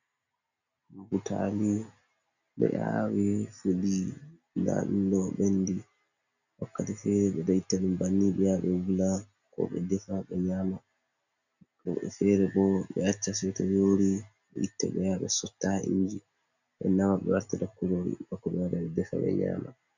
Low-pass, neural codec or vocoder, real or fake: 7.2 kHz; none; real